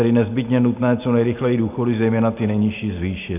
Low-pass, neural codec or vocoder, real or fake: 3.6 kHz; none; real